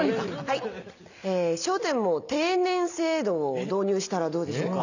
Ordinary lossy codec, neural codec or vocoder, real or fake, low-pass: none; none; real; 7.2 kHz